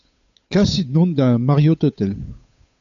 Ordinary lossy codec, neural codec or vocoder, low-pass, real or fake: AAC, 96 kbps; codec, 16 kHz, 8 kbps, FunCodec, trained on Chinese and English, 25 frames a second; 7.2 kHz; fake